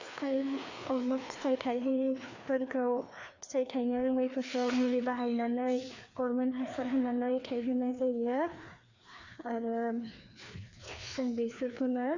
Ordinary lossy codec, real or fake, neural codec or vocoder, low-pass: Opus, 64 kbps; fake; codec, 16 kHz, 2 kbps, FreqCodec, larger model; 7.2 kHz